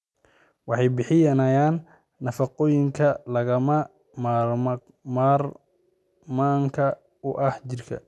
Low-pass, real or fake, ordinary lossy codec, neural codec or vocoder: none; real; none; none